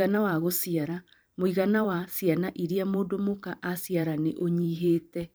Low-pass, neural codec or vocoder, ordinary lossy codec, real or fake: none; vocoder, 44.1 kHz, 128 mel bands every 512 samples, BigVGAN v2; none; fake